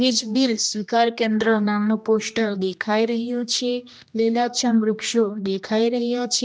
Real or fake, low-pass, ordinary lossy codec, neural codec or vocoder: fake; none; none; codec, 16 kHz, 1 kbps, X-Codec, HuBERT features, trained on general audio